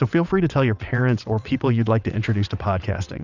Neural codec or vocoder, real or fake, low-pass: vocoder, 22.05 kHz, 80 mel bands, WaveNeXt; fake; 7.2 kHz